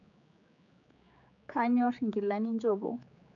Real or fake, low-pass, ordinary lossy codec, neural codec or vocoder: fake; 7.2 kHz; none; codec, 16 kHz, 4 kbps, X-Codec, HuBERT features, trained on general audio